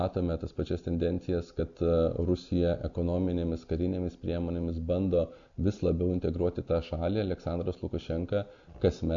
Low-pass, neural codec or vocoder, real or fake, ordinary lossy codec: 7.2 kHz; none; real; MP3, 96 kbps